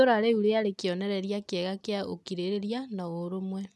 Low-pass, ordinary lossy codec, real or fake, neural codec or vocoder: none; none; real; none